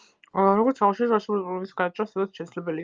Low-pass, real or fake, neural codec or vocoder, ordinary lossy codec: 7.2 kHz; real; none; Opus, 24 kbps